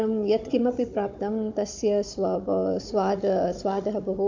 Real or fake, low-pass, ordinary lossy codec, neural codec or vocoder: fake; 7.2 kHz; AAC, 48 kbps; codec, 16 kHz, 16 kbps, FunCodec, trained on Chinese and English, 50 frames a second